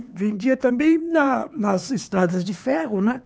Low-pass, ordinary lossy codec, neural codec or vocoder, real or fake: none; none; codec, 16 kHz, 4 kbps, X-Codec, HuBERT features, trained on LibriSpeech; fake